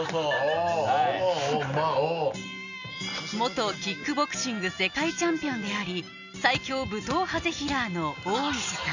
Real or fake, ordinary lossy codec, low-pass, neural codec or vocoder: real; none; 7.2 kHz; none